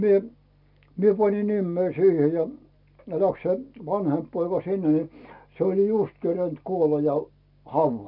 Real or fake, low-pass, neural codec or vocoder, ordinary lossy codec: real; 5.4 kHz; none; none